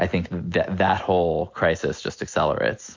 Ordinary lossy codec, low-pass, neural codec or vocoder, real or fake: MP3, 48 kbps; 7.2 kHz; none; real